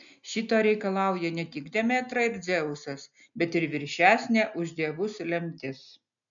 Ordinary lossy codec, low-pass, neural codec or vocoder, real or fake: MP3, 96 kbps; 7.2 kHz; none; real